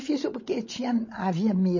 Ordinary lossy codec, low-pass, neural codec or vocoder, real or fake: MP3, 32 kbps; 7.2 kHz; codec, 16 kHz, 16 kbps, FreqCodec, larger model; fake